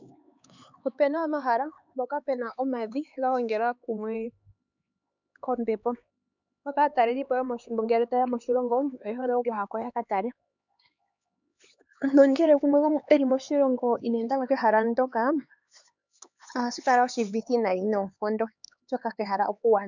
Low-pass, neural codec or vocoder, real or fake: 7.2 kHz; codec, 16 kHz, 4 kbps, X-Codec, HuBERT features, trained on LibriSpeech; fake